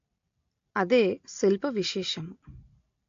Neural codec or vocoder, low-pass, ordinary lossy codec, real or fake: none; 7.2 kHz; AAC, 48 kbps; real